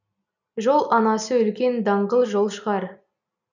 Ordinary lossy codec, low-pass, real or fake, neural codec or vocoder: none; 7.2 kHz; real; none